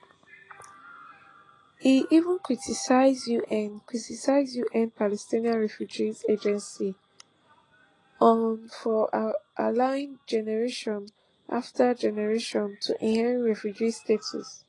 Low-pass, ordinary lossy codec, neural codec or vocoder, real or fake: 10.8 kHz; AAC, 32 kbps; none; real